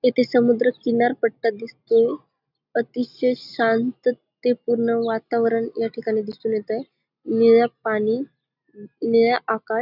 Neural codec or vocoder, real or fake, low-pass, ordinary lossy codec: none; real; 5.4 kHz; none